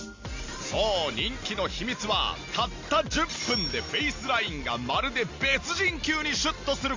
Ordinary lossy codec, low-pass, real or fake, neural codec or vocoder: AAC, 48 kbps; 7.2 kHz; real; none